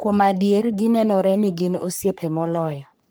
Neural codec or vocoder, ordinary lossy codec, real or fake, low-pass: codec, 44.1 kHz, 3.4 kbps, Pupu-Codec; none; fake; none